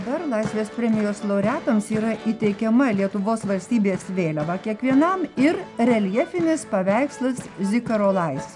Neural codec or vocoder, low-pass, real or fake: none; 10.8 kHz; real